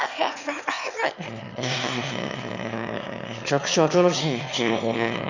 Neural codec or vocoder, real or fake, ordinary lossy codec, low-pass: autoencoder, 22.05 kHz, a latent of 192 numbers a frame, VITS, trained on one speaker; fake; Opus, 64 kbps; 7.2 kHz